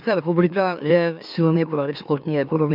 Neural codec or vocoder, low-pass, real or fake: autoencoder, 44.1 kHz, a latent of 192 numbers a frame, MeloTTS; 5.4 kHz; fake